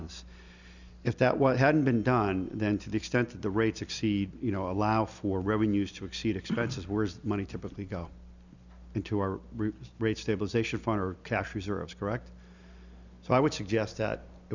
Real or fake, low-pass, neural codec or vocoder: real; 7.2 kHz; none